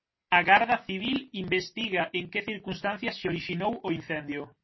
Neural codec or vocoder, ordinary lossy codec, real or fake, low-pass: none; MP3, 24 kbps; real; 7.2 kHz